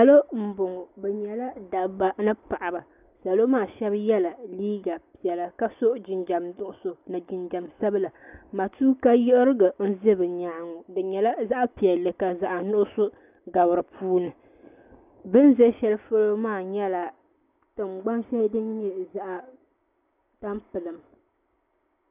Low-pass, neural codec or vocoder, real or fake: 3.6 kHz; none; real